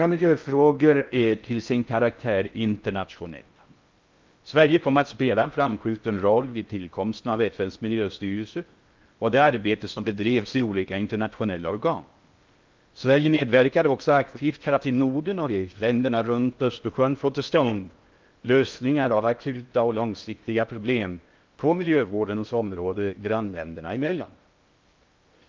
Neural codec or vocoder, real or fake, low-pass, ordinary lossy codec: codec, 16 kHz in and 24 kHz out, 0.6 kbps, FocalCodec, streaming, 4096 codes; fake; 7.2 kHz; Opus, 24 kbps